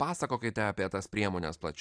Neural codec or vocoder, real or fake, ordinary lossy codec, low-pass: vocoder, 44.1 kHz, 128 mel bands every 512 samples, BigVGAN v2; fake; AAC, 64 kbps; 9.9 kHz